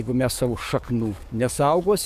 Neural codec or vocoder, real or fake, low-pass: codec, 44.1 kHz, 7.8 kbps, DAC; fake; 14.4 kHz